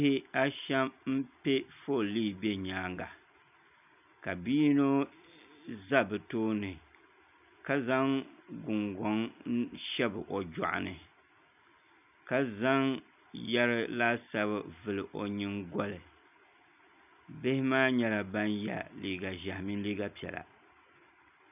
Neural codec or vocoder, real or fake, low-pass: none; real; 3.6 kHz